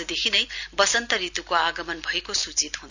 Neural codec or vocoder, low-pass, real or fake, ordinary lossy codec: none; 7.2 kHz; real; none